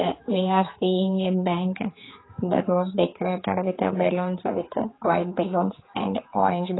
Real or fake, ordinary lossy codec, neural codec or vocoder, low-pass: fake; AAC, 16 kbps; codec, 16 kHz, 4 kbps, X-Codec, HuBERT features, trained on general audio; 7.2 kHz